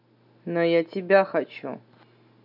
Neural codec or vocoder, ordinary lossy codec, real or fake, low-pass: none; none; real; 5.4 kHz